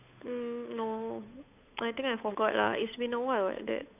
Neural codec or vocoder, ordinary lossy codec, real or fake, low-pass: codec, 16 kHz, 8 kbps, FunCodec, trained on Chinese and English, 25 frames a second; none; fake; 3.6 kHz